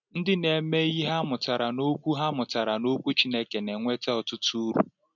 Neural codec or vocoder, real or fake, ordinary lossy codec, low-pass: none; real; none; 7.2 kHz